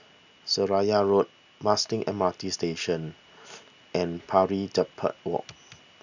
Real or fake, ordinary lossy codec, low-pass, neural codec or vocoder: real; none; 7.2 kHz; none